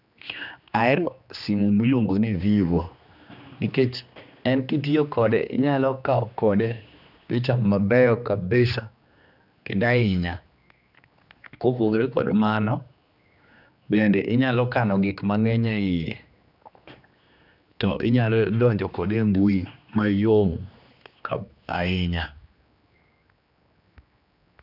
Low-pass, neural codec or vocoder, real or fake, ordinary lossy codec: 5.4 kHz; codec, 16 kHz, 2 kbps, X-Codec, HuBERT features, trained on general audio; fake; none